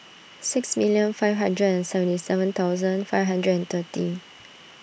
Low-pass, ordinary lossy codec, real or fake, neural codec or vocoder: none; none; real; none